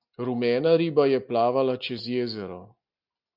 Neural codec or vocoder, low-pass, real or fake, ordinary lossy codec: none; 5.4 kHz; real; MP3, 48 kbps